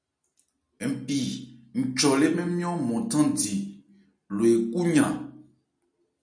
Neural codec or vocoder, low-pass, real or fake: none; 9.9 kHz; real